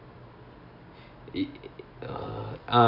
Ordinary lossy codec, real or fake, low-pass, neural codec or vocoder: none; real; 5.4 kHz; none